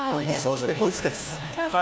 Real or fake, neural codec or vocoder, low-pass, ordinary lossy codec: fake; codec, 16 kHz, 1 kbps, FunCodec, trained on LibriTTS, 50 frames a second; none; none